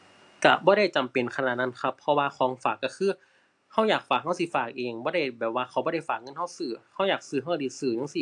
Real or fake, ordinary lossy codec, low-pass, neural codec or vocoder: real; AAC, 64 kbps; 10.8 kHz; none